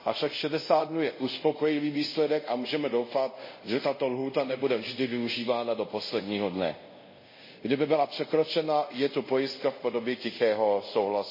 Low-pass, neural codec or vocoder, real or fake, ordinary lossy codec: 5.4 kHz; codec, 24 kHz, 0.5 kbps, DualCodec; fake; MP3, 24 kbps